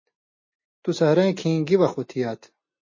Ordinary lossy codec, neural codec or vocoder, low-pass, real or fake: MP3, 32 kbps; none; 7.2 kHz; real